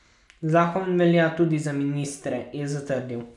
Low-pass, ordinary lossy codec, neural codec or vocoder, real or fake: 10.8 kHz; none; none; real